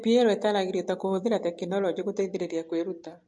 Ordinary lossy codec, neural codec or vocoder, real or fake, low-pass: MP3, 48 kbps; none; real; 10.8 kHz